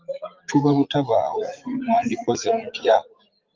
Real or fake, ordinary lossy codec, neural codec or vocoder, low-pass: fake; Opus, 24 kbps; vocoder, 44.1 kHz, 80 mel bands, Vocos; 7.2 kHz